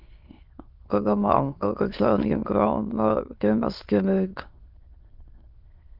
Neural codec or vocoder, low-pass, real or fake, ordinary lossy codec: autoencoder, 22.05 kHz, a latent of 192 numbers a frame, VITS, trained on many speakers; 5.4 kHz; fake; Opus, 32 kbps